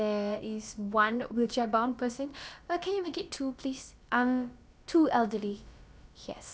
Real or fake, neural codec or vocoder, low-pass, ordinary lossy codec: fake; codec, 16 kHz, about 1 kbps, DyCAST, with the encoder's durations; none; none